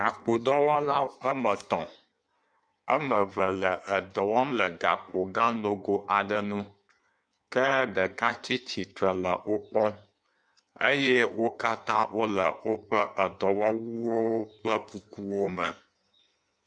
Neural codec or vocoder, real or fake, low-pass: codec, 16 kHz in and 24 kHz out, 1.1 kbps, FireRedTTS-2 codec; fake; 9.9 kHz